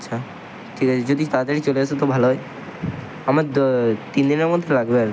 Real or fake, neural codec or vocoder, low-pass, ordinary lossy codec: real; none; none; none